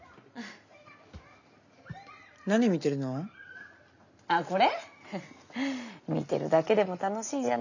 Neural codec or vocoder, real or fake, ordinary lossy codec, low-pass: none; real; none; 7.2 kHz